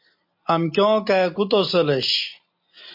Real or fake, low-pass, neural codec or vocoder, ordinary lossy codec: real; 5.4 kHz; none; MP3, 32 kbps